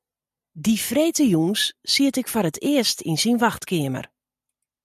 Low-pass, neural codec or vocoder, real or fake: 14.4 kHz; none; real